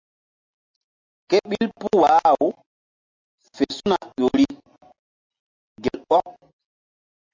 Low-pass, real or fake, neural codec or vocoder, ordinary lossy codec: 7.2 kHz; real; none; MP3, 48 kbps